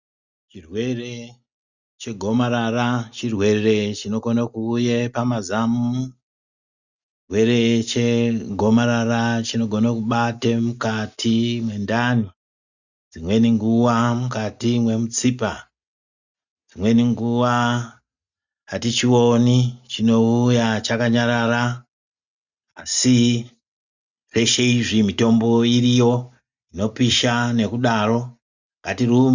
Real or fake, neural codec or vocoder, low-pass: real; none; 7.2 kHz